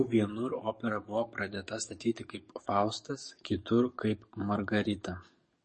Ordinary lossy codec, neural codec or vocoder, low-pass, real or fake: MP3, 32 kbps; codec, 44.1 kHz, 7.8 kbps, DAC; 10.8 kHz; fake